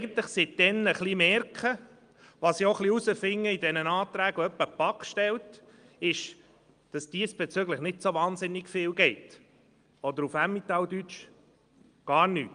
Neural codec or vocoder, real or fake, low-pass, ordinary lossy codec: none; real; 9.9 kHz; Opus, 32 kbps